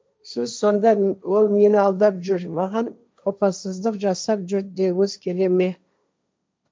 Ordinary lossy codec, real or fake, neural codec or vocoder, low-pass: none; fake; codec, 16 kHz, 1.1 kbps, Voila-Tokenizer; none